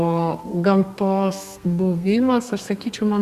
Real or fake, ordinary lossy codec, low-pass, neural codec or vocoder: fake; Opus, 64 kbps; 14.4 kHz; codec, 32 kHz, 1.9 kbps, SNAC